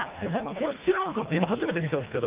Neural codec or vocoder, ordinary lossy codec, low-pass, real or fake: codec, 24 kHz, 1.5 kbps, HILCodec; Opus, 32 kbps; 3.6 kHz; fake